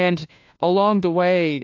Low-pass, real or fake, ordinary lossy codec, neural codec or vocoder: 7.2 kHz; fake; AAC, 48 kbps; codec, 16 kHz, 1 kbps, FunCodec, trained on LibriTTS, 50 frames a second